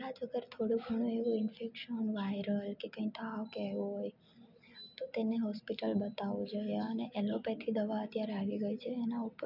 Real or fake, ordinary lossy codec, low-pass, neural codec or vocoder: real; none; 5.4 kHz; none